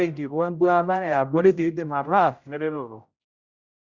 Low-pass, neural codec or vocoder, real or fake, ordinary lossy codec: 7.2 kHz; codec, 16 kHz, 0.5 kbps, X-Codec, HuBERT features, trained on general audio; fake; Opus, 64 kbps